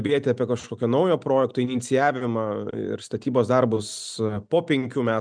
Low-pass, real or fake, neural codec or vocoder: 9.9 kHz; real; none